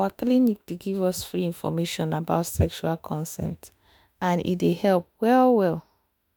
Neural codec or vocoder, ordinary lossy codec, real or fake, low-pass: autoencoder, 48 kHz, 32 numbers a frame, DAC-VAE, trained on Japanese speech; none; fake; none